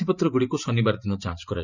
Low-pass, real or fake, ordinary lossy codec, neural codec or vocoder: 7.2 kHz; fake; none; vocoder, 44.1 kHz, 128 mel bands every 512 samples, BigVGAN v2